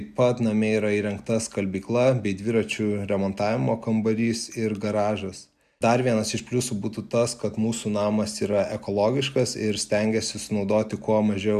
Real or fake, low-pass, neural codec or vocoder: real; 14.4 kHz; none